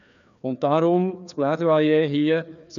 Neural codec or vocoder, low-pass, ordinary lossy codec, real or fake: codec, 16 kHz, 2 kbps, FreqCodec, larger model; 7.2 kHz; none; fake